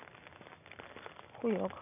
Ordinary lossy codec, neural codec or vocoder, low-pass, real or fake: none; none; 3.6 kHz; real